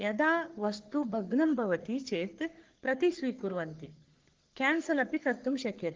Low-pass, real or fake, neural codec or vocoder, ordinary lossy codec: 7.2 kHz; fake; codec, 44.1 kHz, 3.4 kbps, Pupu-Codec; Opus, 16 kbps